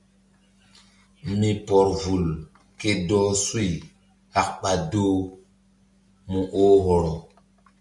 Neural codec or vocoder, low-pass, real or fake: none; 10.8 kHz; real